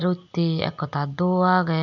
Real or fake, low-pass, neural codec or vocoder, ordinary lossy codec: real; 7.2 kHz; none; none